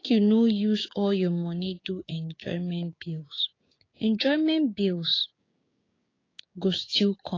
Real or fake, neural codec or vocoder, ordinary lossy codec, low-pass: fake; codec, 16 kHz, 6 kbps, DAC; AAC, 32 kbps; 7.2 kHz